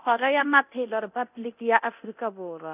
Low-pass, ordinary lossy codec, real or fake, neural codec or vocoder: 3.6 kHz; none; fake; codec, 24 kHz, 0.9 kbps, DualCodec